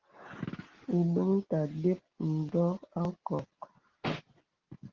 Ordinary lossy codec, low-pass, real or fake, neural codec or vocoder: Opus, 16 kbps; 7.2 kHz; real; none